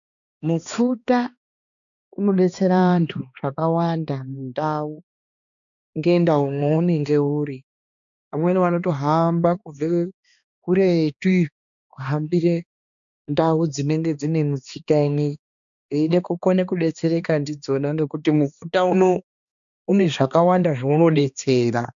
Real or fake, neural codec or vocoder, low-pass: fake; codec, 16 kHz, 2 kbps, X-Codec, HuBERT features, trained on balanced general audio; 7.2 kHz